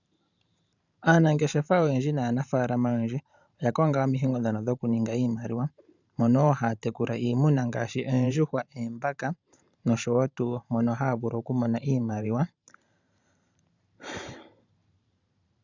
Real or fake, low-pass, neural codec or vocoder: fake; 7.2 kHz; vocoder, 44.1 kHz, 128 mel bands every 512 samples, BigVGAN v2